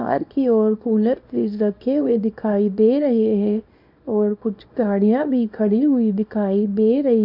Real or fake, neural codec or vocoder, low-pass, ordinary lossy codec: fake; codec, 24 kHz, 0.9 kbps, WavTokenizer, small release; 5.4 kHz; none